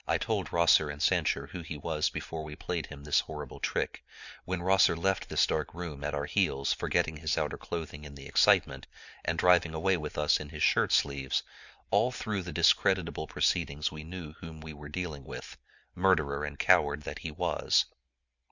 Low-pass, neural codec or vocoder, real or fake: 7.2 kHz; none; real